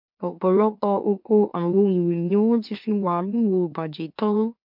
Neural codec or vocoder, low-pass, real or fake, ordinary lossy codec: autoencoder, 44.1 kHz, a latent of 192 numbers a frame, MeloTTS; 5.4 kHz; fake; MP3, 48 kbps